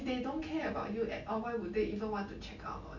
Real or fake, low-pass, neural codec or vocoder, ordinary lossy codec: real; 7.2 kHz; none; none